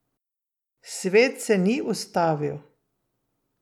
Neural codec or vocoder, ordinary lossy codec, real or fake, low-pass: none; none; real; 19.8 kHz